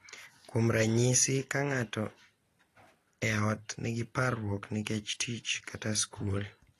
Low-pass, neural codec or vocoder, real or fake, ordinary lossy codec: 14.4 kHz; none; real; AAC, 48 kbps